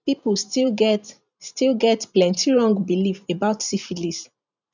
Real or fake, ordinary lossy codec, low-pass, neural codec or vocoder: fake; none; 7.2 kHz; vocoder, 44.1 kHz, 128 mel bands every 512 samples, BigVGAN v2